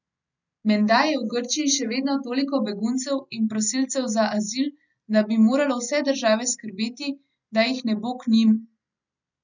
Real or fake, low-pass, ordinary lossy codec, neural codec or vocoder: real; 7.2 kHz; none; none